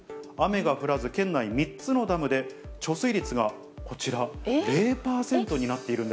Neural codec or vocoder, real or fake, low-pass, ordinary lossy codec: none; real; none; none